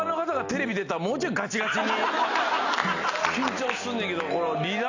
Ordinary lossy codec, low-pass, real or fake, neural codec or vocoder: AAC, 48 kbps; 7.2 kHz; real; none